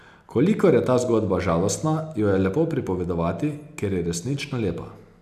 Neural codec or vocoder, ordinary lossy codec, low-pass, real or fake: none; none; 14.4 kHz; real